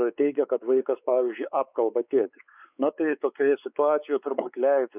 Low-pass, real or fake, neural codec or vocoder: 3.6 kHz; fake; codec, 16 kHz, 4 kbps, X-Codec, WavLM features, trained on Multilingual LibriSpeech